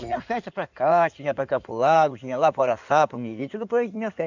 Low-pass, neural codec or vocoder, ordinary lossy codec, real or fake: 7.2 kHz; codec, 16 kHz in and 24 kHz out, 2.2 kbps, FireRedTTS-2 codec; none; fake